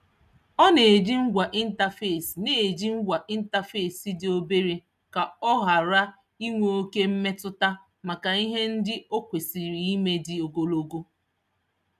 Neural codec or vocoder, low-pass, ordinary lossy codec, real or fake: none; 14.4 kHz; none; real